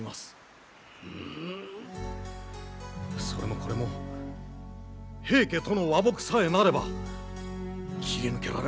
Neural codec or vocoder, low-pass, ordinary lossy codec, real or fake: none; none; none; real